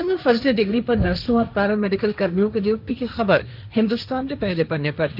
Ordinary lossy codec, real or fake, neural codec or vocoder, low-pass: none; fake; codec, 16 kHz, 1.1 kbps, Voila-Tokenizer; 5.4 kHz